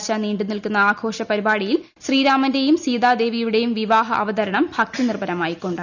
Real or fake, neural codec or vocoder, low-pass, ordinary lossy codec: real; none; 7.2 kHz; none